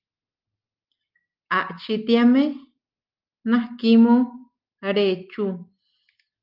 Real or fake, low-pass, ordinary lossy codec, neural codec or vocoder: real; 5.4 kHz; Opus, 24 kbps; none